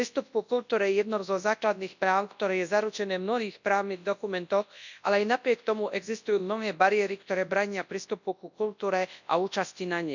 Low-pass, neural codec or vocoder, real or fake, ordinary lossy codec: 7.2 kHz; codec, 24 kHz, 0.9 kbps, WavTokenizer, large speech release; fake; none